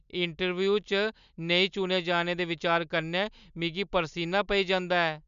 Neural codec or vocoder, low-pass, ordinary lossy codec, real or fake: none; 7.2 kHz; MP3, 96 kbps; real